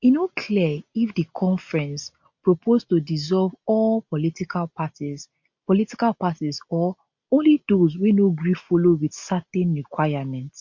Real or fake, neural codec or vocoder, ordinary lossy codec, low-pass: real; none; MP3, 48 kbps; 7.2 kHz